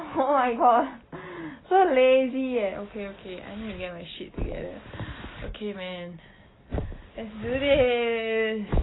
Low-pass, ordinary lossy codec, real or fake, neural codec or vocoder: 7.2 kHz; AAC, 16 kbps; real; none